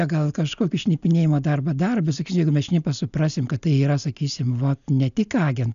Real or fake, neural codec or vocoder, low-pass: real; none; 7.2 kHz